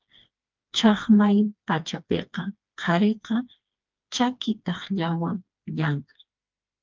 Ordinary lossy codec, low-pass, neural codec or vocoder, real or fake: Opus, 24 kbps; 7.2 kHz; codec, 16 kHz, 2 kbps, FreqCodec, smaller model; fake